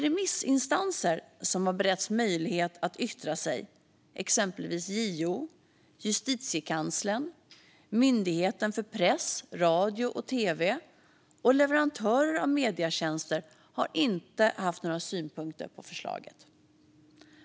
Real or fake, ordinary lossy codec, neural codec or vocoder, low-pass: real; none; none; none